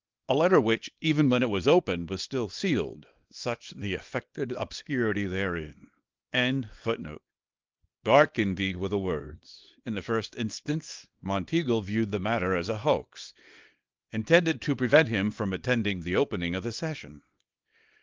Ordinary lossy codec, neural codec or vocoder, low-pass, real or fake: Opus, 32 kbps; codec, 24 kHz, 0.9 kbps, WavTokenizer, small release; 7.2 kHz; fake